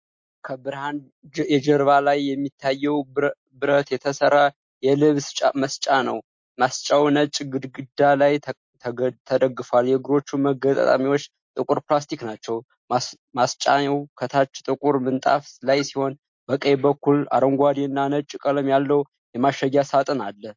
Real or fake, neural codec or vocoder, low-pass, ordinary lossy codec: real; none; 7.2 kHz; MP3, 48 kbps